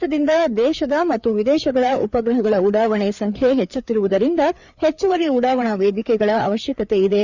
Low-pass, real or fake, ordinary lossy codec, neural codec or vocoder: 7.2 kHz; fake; Opus, 64 kbps; codec, 16 kHz, 4 kbps, FreqCodec, larger model